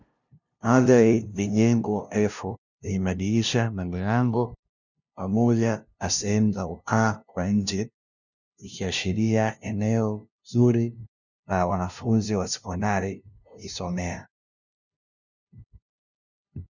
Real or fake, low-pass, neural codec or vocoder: fake; 7.2 kHz; codec, 16 kHz, 0.5 kbps, FunCodec, trained on LibriTTS, 25 frames a second